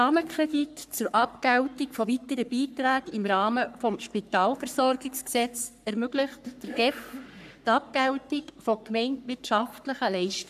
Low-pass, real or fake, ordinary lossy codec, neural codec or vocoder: 14.4 kHz; fake; none; codec, 44.1 kHz, 3.4 kbps, Pupu-Codec